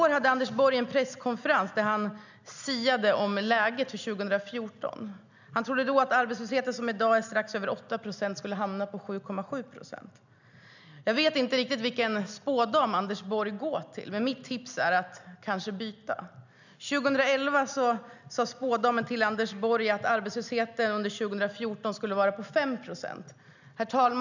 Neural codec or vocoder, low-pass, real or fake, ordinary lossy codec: none; 7.2 kHz; real; none